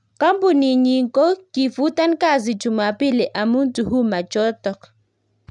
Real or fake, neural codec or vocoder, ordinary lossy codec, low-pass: real; none; none; 10.8 kHz